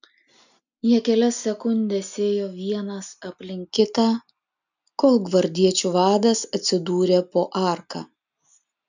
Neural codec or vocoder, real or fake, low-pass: none; real; 7.2 kHz